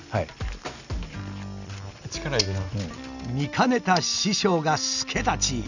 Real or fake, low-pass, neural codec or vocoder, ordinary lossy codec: real; 7.2 kHz; none; none